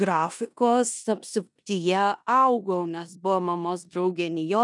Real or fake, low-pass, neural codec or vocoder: fake; 10.8 kHz; codec, 16 kHz in and 24 kHz out, 0.9 kbps, LongCat-Audio-Codec, four codebook decoder